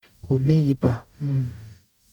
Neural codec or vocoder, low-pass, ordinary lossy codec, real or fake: codec, 44.1 kHz, 0.9 kbps, DAC; 19.8 kHz; none; fake